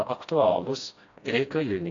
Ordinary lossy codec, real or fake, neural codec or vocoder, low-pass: AAC, 48 kbps; fake; codec, 16 kHz, 1 kbps, FreqCodec, smaller model; 7.2 kHz